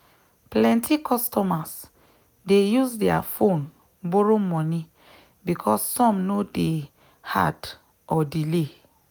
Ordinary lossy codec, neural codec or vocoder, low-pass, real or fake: none; none; none; real